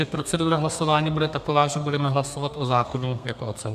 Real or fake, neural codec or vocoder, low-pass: fake; codec, 32 kHz, 1.9 kbps, SNAC; 14.4 kHz